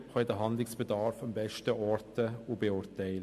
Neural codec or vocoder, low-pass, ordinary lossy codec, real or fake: none; 14.4 kHz; MP3, 64 kbps; real